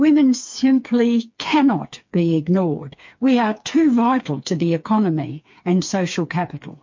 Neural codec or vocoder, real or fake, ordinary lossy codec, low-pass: codec, 16 kHz, 4 kbps, FreqCodec, smaller model; fake; MP3, 48 kbps; 7.2 kHz